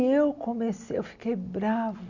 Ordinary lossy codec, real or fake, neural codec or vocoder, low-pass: none; real; none; 7.2 kHz